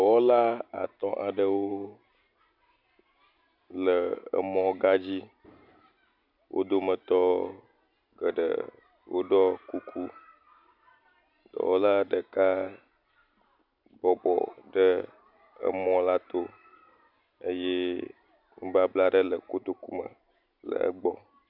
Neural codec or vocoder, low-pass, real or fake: none; 5.4 kHz; real